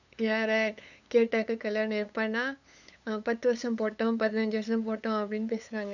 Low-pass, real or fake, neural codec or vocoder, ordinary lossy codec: 7.2 kHz; fake; codec, 16 kHz, 8 kbps, FunCodec, trained on LibriTTS, 25 frames a second; none